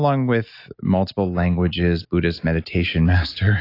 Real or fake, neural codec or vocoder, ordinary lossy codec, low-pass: real; none; AAC, 32 kbps; 5.4 kHz